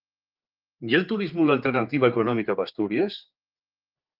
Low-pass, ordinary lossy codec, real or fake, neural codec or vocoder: 5.4 kHz; Opus, 24 kbps; fake; codec, 16 kHz, 4 kbps, X-Codec, HuBERT features, trained on general audio